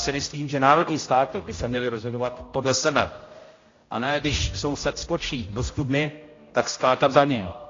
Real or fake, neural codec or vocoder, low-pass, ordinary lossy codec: fake; codec, 16 kHz, 0.5 kbps, X-Codec, HuBERT features, trained on general audio; 7.2 kHz; AAC, 32 kbps